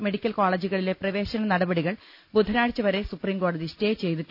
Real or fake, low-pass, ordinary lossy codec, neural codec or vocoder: real; 5.4 kHz; none; none